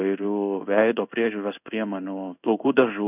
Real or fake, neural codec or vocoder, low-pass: fake; codec, 16 kHz in and 24 kHz out, 1 kbps, XY-Tokenizer; 3.6 kHz